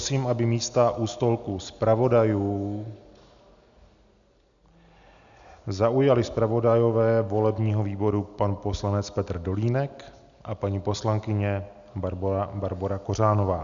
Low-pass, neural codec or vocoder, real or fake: 7.2 kHz; none; real